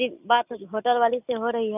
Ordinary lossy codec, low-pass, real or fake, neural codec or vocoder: none; 3.6 kHz; real; none